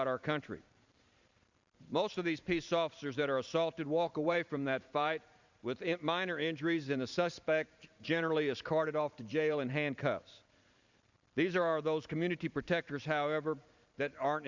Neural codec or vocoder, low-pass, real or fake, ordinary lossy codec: vocoder, 44.1 kHz, 128 mel bands every 512 samples, BigVGAN v2; 7.2 kHz; fake; Opus, 64 kbps